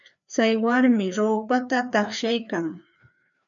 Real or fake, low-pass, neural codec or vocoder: fake; 7.2 kHz; codec, 16 kHz, 2 kbps, FreqCodec, larger model